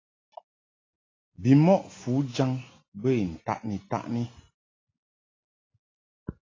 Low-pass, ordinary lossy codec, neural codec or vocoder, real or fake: 7.2 kHz; AAC, 32 kbps; none; real